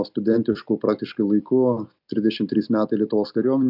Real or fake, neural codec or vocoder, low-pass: real; none; 5.4 kHz